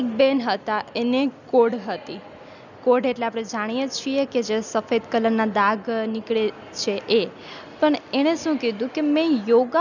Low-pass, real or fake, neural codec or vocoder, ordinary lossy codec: 7.2 kHz; real; none; none